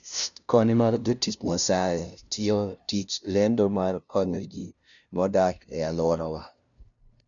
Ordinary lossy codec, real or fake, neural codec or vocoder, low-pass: none; fake; codec, 16 kHz, 0.5 kbps, FunCodec, trained on LibriTTS, 25 frames a second; 7.2 kHz